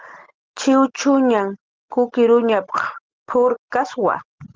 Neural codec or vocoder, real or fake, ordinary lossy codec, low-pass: none; real; Opus, 16 kbps; 7.2 kHz